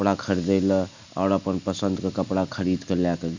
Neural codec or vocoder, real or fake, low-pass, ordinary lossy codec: none; real; 7.2 kHz; Opus, 64 kbps